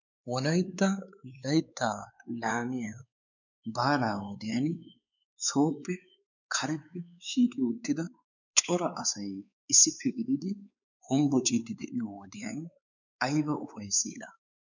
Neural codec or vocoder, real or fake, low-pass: codec, 16 kHz, 4 kbps, X-Codec, WavLM features, trained on Multilingual LibriSpeech; fake; 7.2 kHz